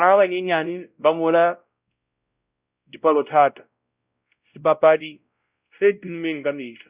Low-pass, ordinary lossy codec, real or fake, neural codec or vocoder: 3.6 kHz; Opus, 64 kbps; fake; codec, 16 kHz, 0.5 kbps, X-Codec, WavLM features, trained on Multilingual LibriSpeech